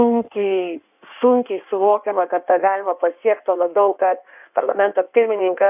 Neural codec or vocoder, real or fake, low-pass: codec, 16 kHz in and 24 kHz out, 1.1 kbps, FireRedTTS-2 codec; fake; 3.6 kHz